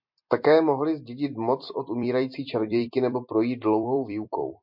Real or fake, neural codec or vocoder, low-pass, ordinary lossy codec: fake; vocoder, 44.1 kHz, 128 mel bands every 256 samples, BigVGAN v2; 5.4 kHz; MP3, 48 kbps